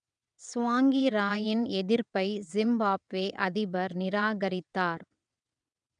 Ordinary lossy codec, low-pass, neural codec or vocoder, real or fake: none; 9.9 kHz; vocoder, 22.05 kHz, 80 mel bands, WaveNeXt; fake